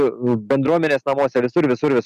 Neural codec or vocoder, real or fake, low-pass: none; real; 14.4 kHz